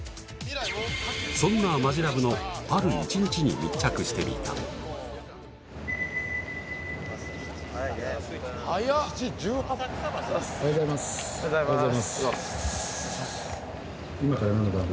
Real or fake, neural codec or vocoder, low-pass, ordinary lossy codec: real; none; none; none